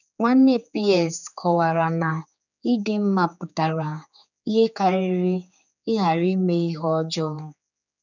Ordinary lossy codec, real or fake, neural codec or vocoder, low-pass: none; fake; codec, 16 kHz, 4 kbps, X-Codec, HuBERT features, trained on general audio; 7.2 kHz